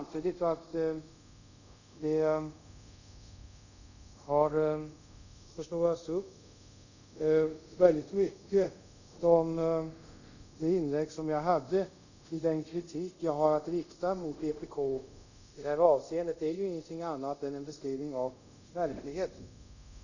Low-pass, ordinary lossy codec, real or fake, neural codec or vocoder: 7.2 kHz; none; fake; codec, 24 kHz, 0.5 kbps, DualCodec